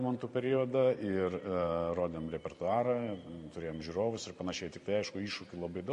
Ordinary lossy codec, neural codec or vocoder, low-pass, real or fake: MP3, 48 kbps; vocoder, 44.1 kHz, 128 mel bands every 512 samples, BigVGAN v2; 14.4 kHz; fake